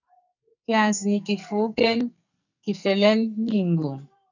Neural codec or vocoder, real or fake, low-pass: codec, 44.1 kHz, 2.6 kbps, SNAC; fake; 7.2 kHz